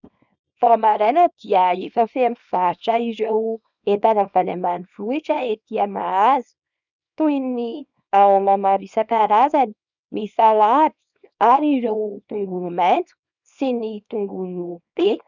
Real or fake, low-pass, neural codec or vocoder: fake; 7.2 kHz; codec, 24 kHz, 0.9 kbps, WavTokenizer, small release